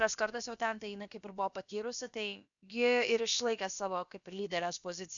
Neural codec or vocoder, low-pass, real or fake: codec, 16 kHz, about 1 kbps, DyCAST, with the encoder's durations; 7.2 kHz; fake